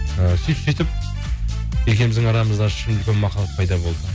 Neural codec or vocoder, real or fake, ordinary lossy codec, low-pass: none; real; none; none